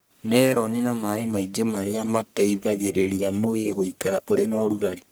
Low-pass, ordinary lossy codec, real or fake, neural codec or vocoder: none; none; fake; codec, 44.1 kHz, 1.7 kbps, Pupu-Codec